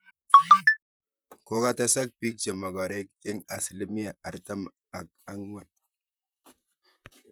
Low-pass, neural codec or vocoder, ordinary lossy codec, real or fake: none; vocoder, 44.1 kHz, 128 mel bands, Pupu-Vocoder; none; fake